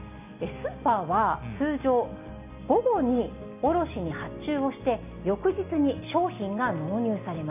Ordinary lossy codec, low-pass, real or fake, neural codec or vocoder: none; 3.6 kHz; real; none